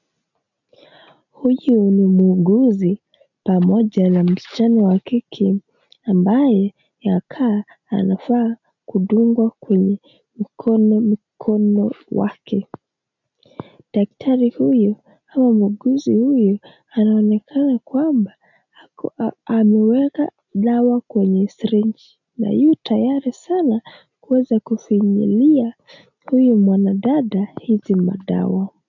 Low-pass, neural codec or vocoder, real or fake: 7.2 kHz; none; real